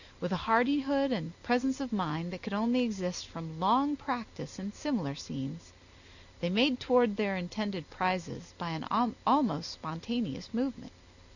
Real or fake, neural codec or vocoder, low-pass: real; none; 7.2 kHz